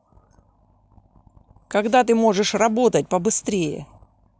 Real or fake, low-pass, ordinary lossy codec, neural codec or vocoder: real; none; none; none